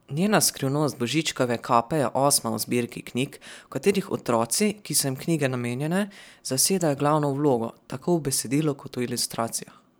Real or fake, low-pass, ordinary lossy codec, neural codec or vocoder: fake; none; none; vocoder, 44.1 kHz, 128 mel bands every 512 samples, BigVGAN v2